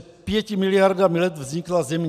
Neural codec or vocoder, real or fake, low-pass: none; real; 14.4 kHz